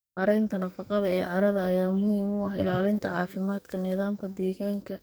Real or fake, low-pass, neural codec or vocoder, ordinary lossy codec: fake; none; codec, 44.1 kHz, 2.6 kbps, SNAC; none